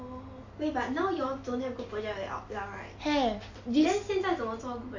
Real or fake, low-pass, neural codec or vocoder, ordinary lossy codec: real; 7.2 kHz; none; AAC, 48 kbps